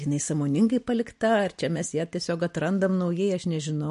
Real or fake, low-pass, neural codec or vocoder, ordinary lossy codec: real; 14.4 kHz; none; MP3, 48 kbps